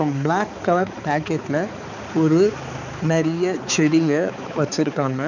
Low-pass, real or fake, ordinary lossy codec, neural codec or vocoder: 7.2 kHz; fake; none; codec, 16 kHz, 4 kbps, X-Codec, HuBERT features, trained on balanced general audio